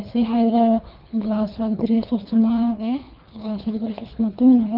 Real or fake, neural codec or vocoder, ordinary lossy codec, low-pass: fake; codec, 24 kHz, 3 kbps, HILCodec; Opus, 24 kbps; 5.4 kHz